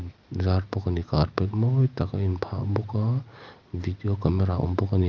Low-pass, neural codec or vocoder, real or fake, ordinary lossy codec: 7.2 kHz; none; real; Opus, 24 kbps